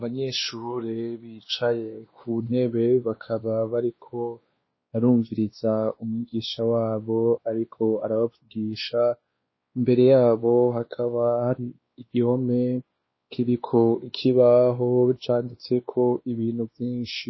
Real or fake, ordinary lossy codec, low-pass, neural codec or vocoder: fake; MP3, 24 kbps; 7.2 kHz; codec, 16 kHz, 2 kbps, X-Codec, WavLM features, trained on Multilingual LibriSpeech